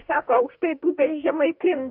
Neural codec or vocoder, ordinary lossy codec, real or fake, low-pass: codec, 44.1 kHz, 2.6 kbps, DAC; AAC, 48 kbps; fake; 5.4 kHz